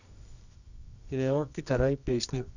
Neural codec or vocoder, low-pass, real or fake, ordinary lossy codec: codec, 24 kHz, 0.9 kbps, WavTokenizer, medium music audio release; 7.2 kHz; fake; none